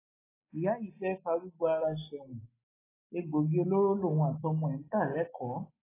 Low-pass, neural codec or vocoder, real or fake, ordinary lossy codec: 3.6 kHz; none; real; AAC, 16 kbps